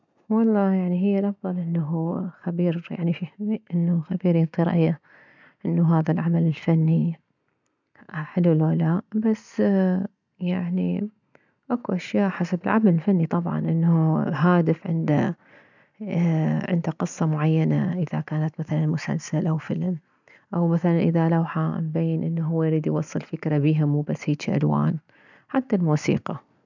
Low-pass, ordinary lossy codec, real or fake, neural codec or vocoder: 7.2 kHz; none; real; none